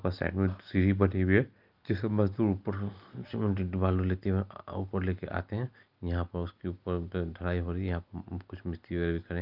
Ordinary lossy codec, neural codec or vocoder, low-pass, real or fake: Opus, 24 kbps; none; 5.4 kHz; real